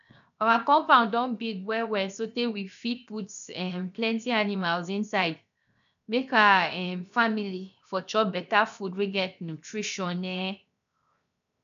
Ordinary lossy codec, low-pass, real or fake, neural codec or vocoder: none; 7.2 kHz; fake; codec, 16 kHz, 0.7 kbps, FocalCodec